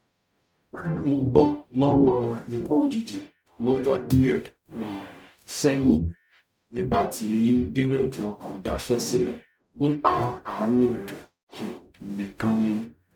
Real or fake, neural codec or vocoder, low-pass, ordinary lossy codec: fake; codec, 44.1 kHz, 0.9 kbps, DAC; 19.8 kHz; none